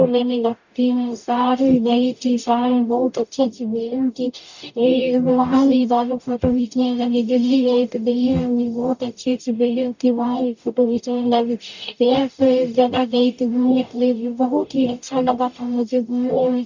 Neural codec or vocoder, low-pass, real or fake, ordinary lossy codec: codec, 44.1 kHz, 0.9 kbps, DAC; 7.2 kHz; fake; none